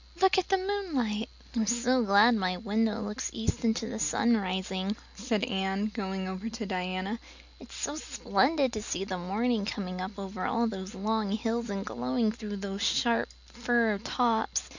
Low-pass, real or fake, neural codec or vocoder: 7.2 kHz; real; none